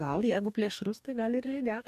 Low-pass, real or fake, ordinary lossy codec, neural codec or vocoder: 14.4 kHz; fake; MP3, 96 kbps; codec, 44.1 kHz, 2.6 kbps, DAC